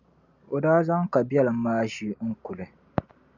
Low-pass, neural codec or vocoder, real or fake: 7.2 kHz; none; real